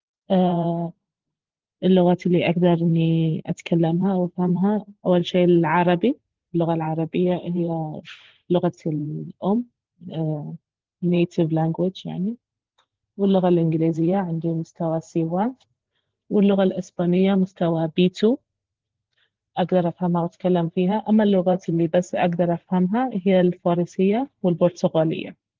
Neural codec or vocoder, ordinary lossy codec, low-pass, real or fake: vocoder, 44.1 kHz, 128 mel bands every 512 samples, BigVGAN v2; Opus, 16 kbps; 7.2 kHz; fake